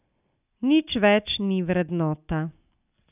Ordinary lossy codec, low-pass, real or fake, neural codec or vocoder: none; 3.6 kHz; real; none